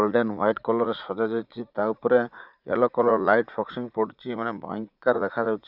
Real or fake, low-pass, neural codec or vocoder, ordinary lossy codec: fake; 5.4 kHz; vocoder, 44.1 kHz, 80 mel bands, Vocos; Opus, 64 kbps